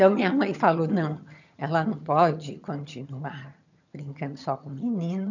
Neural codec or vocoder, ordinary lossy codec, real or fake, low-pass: vocoder, 22.05 kHz, 80 mel bands, HiFi-GAN; none; fake; 7.2 kHz